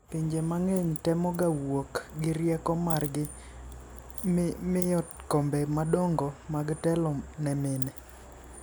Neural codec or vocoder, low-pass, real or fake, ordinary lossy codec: none; none; real; none